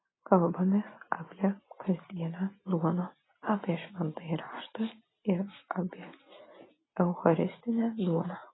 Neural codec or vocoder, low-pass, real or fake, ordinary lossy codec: none; 7.2 kHz; real; AAC, 16 kbps